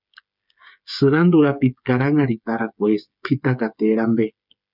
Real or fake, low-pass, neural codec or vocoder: fake; 5.4 kHz; codec, 16 kHz, 8 kbps, FreqCodec, smaller model